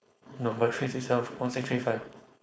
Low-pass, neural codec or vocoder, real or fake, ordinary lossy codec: none; codec, 16 kHz, 4.8 kbps, FACodec; fake; none